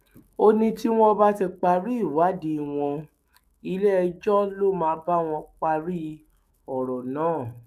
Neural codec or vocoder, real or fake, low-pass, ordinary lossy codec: autoencoder, 48 kHz, 128 numbers a frame, DAC-VAE, trained on Japanese speech; fake; 14.4 kHz; none